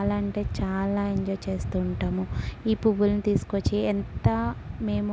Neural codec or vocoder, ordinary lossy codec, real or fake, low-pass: none; none; real; none